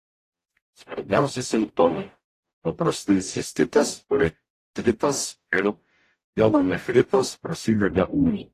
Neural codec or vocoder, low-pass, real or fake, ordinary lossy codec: codec, 44.1 kHz, 0.9 kbps, DAC; 14.4 kHz; fake; AAC, 48 kbps